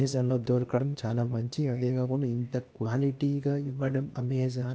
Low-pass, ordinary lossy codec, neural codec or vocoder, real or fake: none; none; codec, 16 kHz, 0.8 kbps, ZipCodec; fake